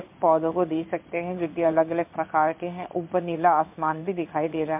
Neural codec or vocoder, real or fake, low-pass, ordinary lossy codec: codec, 16 kHz in and 24 kHz out, 1 kbps, XY-Tokenizer; fake; 3.6 kHz; MP3, 32 kbps